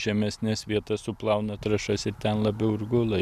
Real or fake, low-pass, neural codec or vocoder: real; 14.4 kHz; none